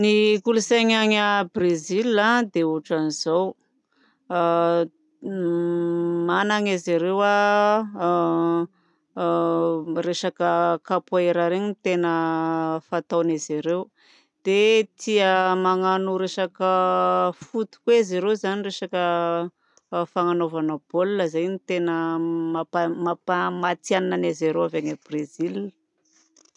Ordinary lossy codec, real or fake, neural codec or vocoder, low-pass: none; real; none; 10.8 kHz